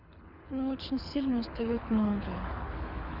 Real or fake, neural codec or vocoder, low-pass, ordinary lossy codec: fake; codec, 24 kHz, 6 kbps, HILCodec; 5.4 kHz; none